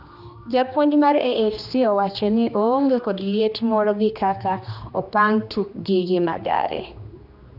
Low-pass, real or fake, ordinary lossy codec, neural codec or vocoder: 5.4 kHz; fake; none; codec, 16 kHz, 2 kbps, X-Codec, HuBERT features, trained on general audio